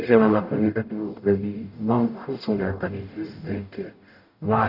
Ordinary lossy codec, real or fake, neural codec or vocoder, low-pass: none; fake; codec, 44.1 kHz, 0.9 kbps, DAC; 5.4 kHz